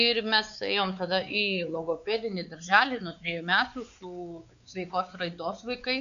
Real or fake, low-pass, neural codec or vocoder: fake; 7.2 kHz; codec, 16 kHz, 4 kbps, X-Codec, WavLM features, trained on Multilingual LibriSpeech